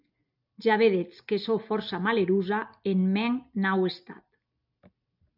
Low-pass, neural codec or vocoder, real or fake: 5.4 kHz; none; real